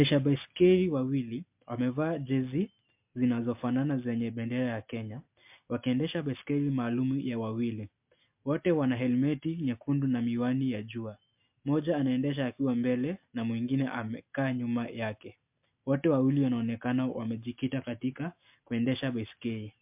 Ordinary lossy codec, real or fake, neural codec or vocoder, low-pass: MP3, 32 kbps; real; none; 3.6 kHz